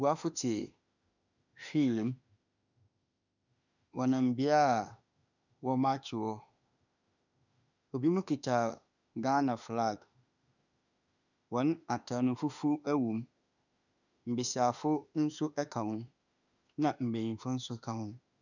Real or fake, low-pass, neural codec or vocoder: fake; 7.2 kHz; autoencoder, 48 kHz, 32 numbers a frame, DAC-VAE, trained on Japanese speech